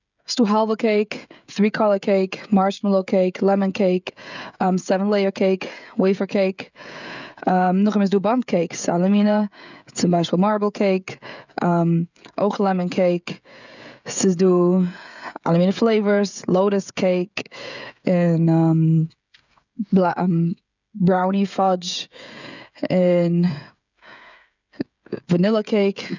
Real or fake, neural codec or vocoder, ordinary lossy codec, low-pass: fake; codec, 16 kHz, 16 kbps, FreqCodec, smaller model; none; 7.2 kHz